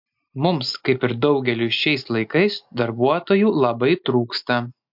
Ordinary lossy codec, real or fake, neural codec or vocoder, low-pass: MP3, 48 kbps; real; none; 5.4 kHz